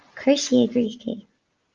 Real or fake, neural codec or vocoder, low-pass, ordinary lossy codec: real; none; 7.2 kHz; Opus, 32 kbps